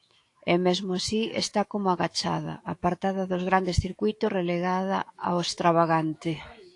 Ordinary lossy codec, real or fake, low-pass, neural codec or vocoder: AAC, 48 kbps; fake; 10.8 kHz; autoencoder, 48 kHz, 128 numbers a frame, DAC-VAE, trained on Japanese speech